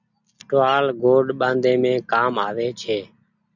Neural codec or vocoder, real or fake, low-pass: none; real; 7.2 kHz